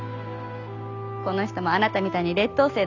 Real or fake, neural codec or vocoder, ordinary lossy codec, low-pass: real; none; none; 7.2 kHz